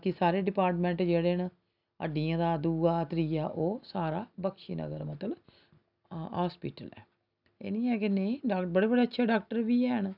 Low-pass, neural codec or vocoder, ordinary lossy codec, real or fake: 5.4 kHz; none; none; real